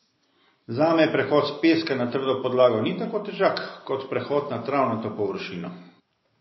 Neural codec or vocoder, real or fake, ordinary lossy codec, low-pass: none; real; MP3, 24 kbps; 7.2 kHz